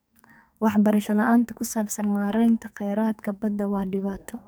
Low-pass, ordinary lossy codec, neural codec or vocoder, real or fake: none; none; codec, 44.1 kHz, 2.6 kbps, SNAC; fake